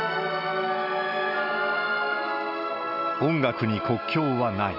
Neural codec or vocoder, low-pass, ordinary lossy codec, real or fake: none; 5.4 kHz; none; real